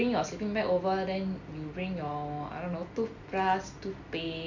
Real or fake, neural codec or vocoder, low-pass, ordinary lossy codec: real; none; 7.2 kHz; none